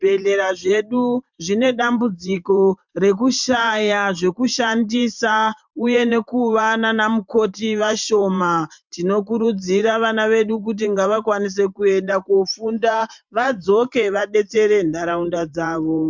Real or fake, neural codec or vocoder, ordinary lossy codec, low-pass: fake; vocoder, 44.1 kHz, 128 mel bands every 512 samples, BigVGAN v2; MP3, 64 kbps; 7.2 kHz